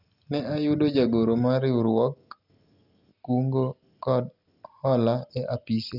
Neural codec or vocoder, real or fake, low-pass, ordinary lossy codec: none; real; 5.4 kHz; none